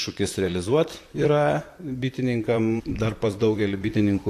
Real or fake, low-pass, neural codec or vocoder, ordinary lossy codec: fake; 14.4 kHz; vocoder, 44.1 kHz, 128 mel bands, Pupu-Vocoder; AAC, 64 kbps